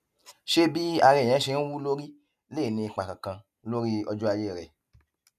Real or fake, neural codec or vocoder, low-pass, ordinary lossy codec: real; none; 14.4 kHz; none